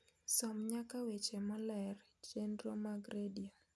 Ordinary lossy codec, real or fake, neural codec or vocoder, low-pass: none; real; none; none